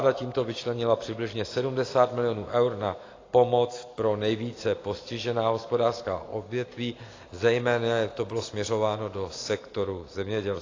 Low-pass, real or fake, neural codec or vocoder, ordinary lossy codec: 7.2 kHz; real; none; AAC, 32 kbps